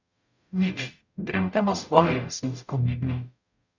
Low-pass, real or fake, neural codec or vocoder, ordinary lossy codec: 7.2 kHz; fake; codec, 44.1 kHz, 0.9 kbps, DAC; none